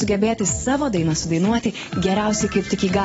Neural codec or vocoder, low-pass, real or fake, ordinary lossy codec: none; 19.8 kHz; real; AAC, 24 kbps